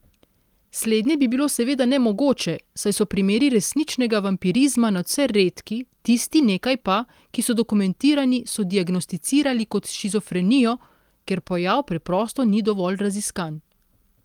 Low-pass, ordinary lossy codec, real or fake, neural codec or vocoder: 19.8 kHz; Opus, 32 kbps; real; none